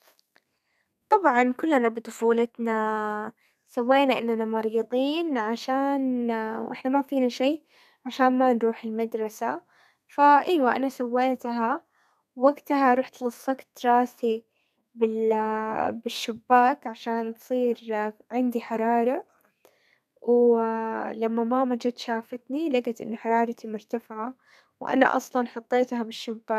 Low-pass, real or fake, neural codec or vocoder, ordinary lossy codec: 14.4 kHz; fake; codec, 32 kHz, 1.9 kbps, SNAC; none